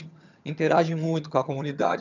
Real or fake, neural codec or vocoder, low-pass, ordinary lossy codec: fake; vocoder, 22.05 kHz, 80 mel bands, HiFi-GAN; 7.2 kHz; none